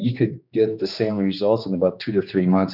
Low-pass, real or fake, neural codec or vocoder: 5.4 kHz; fake; codec, 16 kHz, 2 kbps, X-Codec, HuBERT features, trained on general audio